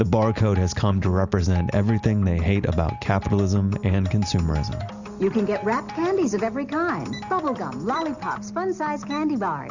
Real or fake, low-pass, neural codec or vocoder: real; 7.2 kHz; none